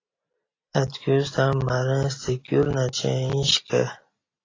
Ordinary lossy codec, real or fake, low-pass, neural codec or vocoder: AAC, 32 kbps; real; 7.2 kHz; none